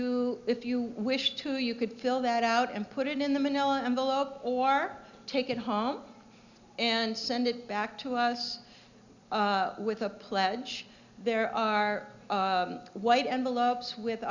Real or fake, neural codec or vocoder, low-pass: real; none; 7.2 kHz